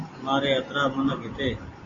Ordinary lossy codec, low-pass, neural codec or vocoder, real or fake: AAC, 32 kbps; 7.2 kHz; none; real